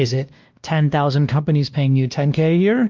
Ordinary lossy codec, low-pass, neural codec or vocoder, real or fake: Opus, 32 kbps; 7.2 kHz; codec, 16 kHz, 1 kbps, X-Codec, WavLM features, trained on Multilingual LibriSpeech; fake